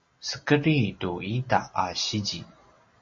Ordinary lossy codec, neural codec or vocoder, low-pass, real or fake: MP3, 32 kbps; none; 7.2 kHz; real